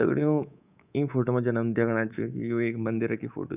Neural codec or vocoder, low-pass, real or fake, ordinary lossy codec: codec, 24 kHz, 3.1 kbps, DualCodec; 3.6 kHz; fake; none